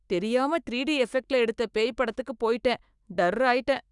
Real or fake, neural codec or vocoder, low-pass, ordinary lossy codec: fake; autoencoder, 48 kHz, 128 numbers a frame, DAC-VAE, trained on Japanese speech; 10.8 kHz; Opus, 64 kbps